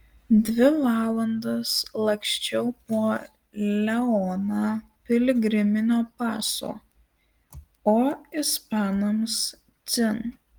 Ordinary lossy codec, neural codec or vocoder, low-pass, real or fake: Opus, 24 kbps; none; 19.8 kHz; real